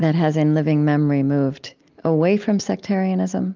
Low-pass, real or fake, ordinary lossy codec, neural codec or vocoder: 7.2 kHz; real; Opus, 32 kbps; none